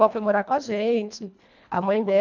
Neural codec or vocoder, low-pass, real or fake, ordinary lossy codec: codec, 24 kHz, 1.5 kbps, HILCodec; 7.2 kHz; fake; none